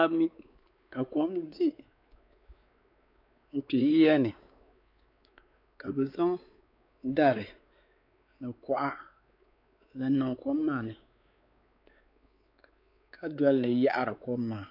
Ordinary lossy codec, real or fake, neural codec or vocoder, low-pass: AAC, 48 kbps; fake; codec, 16 kHz, 4 kbps, X-Codec, WavLM features, trained on Multilingual LibriSpeech; 5.4 kHz